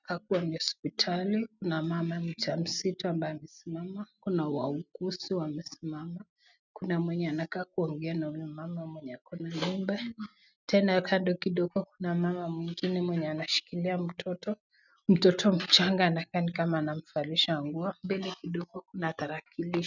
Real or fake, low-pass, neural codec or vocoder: real; 7.2 kHz; none